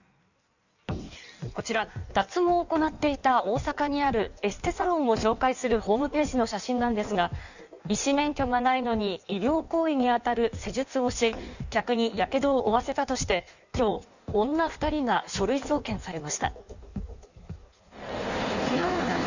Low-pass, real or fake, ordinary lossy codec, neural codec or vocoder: 7.2 kHz; fake; none; codec, 16 kHz in and 24 kHz out, 1.1 kbps, FireRedTTS-2 codec